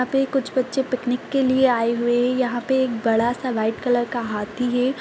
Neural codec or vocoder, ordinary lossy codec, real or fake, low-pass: none; none; real; none